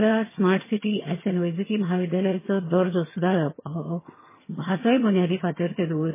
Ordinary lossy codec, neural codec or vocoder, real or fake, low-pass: MP3, 16 kbps; vocoder, 22.05 kHz, 80 mel bands, HiFi-GAN; fake; 3.6 kHz